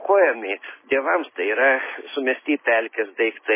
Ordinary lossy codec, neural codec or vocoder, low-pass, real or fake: MP3, 16 kbps; none; 3.6 kHz; real